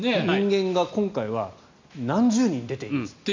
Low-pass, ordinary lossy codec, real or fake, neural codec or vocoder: 7.2 kHz; MP3, 64 kbps; real; none